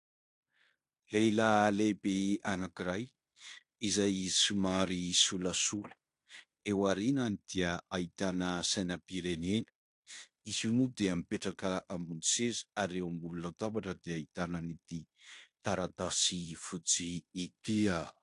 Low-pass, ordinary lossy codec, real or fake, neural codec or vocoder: 10.8 kHz; AAC, 64 kbps; fake; codec, 16 kHz in and 24 kHz out, 0.9 kbps, LongCat-Audio-Codec, fine tuned four codebook decoder